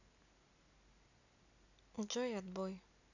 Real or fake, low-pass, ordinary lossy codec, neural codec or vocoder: real; 7.2 kHz; none; none